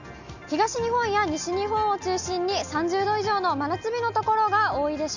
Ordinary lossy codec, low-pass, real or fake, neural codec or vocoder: none; 7.2 kHz; real; none